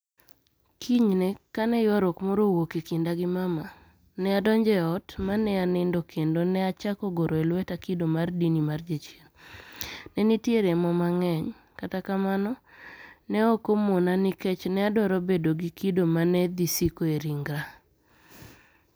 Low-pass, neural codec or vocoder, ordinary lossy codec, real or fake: none; none; none; real